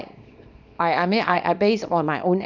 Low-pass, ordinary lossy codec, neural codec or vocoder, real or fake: 7.2 kHz; none; codec, 24 kHz, 0.9 kbps, WavTokenizer, small release; fake